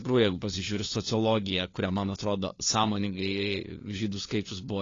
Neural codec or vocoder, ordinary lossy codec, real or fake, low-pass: codec, 16 kHz, 4 kbps, FunCodec, trained on LibriTTS, 50 frames a second; AAC, 32 kbps; fake; 7.2 kHz